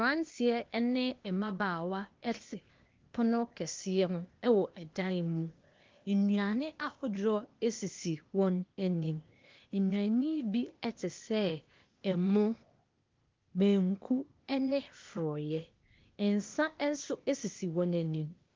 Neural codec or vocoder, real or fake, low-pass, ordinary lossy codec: codec, 16 kHz, 0.8 kbps, ZipCodec; fake; 7.2 kHz; Opus, 32 kbps